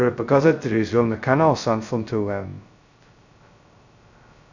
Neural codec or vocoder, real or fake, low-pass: codec, 16 kHz, 0.2 kbps, FocalCodec; fake; 7.2 kHz